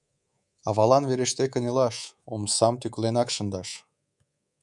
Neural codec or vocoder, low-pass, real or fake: codec, 24 kHz, 3.1 kbps, DualCodec; 10.8 kHz; fake